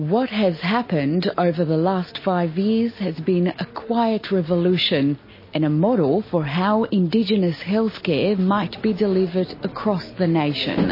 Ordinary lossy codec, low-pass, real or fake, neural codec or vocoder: MP3, 24 kbps; 5.4 kHz; real; none